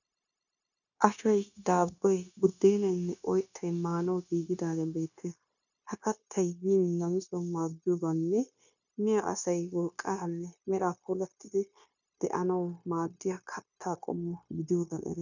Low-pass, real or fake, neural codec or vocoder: 7.2 kHz; fake; codec, 16 kHz, 0.9 kbps, LongCat-Audio-Codec